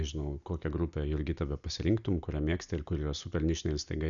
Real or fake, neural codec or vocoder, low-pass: real; none; 7.2 kHz